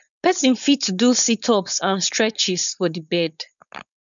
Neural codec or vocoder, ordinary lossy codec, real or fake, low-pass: codec, 16 kHz, 4.8 kbps, FACodec; none; fake; 7.2 kHz